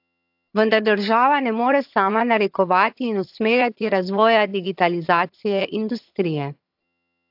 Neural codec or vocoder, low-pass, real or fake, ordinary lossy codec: vocoder, 22.05 kHz, 80 mel bands, HiFi-GAN; 5.4 kHz; fake; AAC, 48 kbps